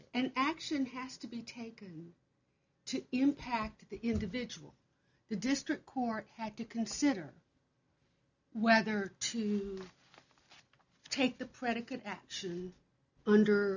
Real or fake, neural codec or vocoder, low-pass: real; none; 7.2 kHz